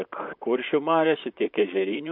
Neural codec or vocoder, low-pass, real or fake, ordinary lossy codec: vocoder, 44.1 kHz, 128 mel bands, Pupu-Vocoder; 5.4 kHz; fake; MP3, 48 kbps